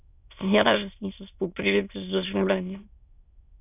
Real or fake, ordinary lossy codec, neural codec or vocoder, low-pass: fake; none; autoencoder, 22.05 kHz, a latent of 192 numbers a frame, VITS, trained on many speakers; 3.6 kHz